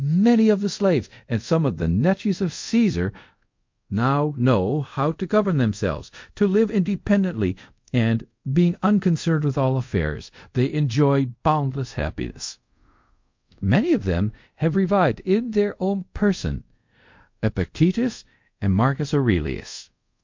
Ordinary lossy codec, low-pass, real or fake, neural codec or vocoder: MP3, 48 kbps; 7.2 kHz; fake; codec, 24 kHz, 0.5 kbps, DualCodec